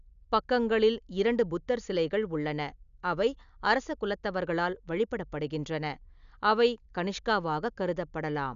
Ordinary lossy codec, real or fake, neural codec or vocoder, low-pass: none; real; none; 7.2 kHz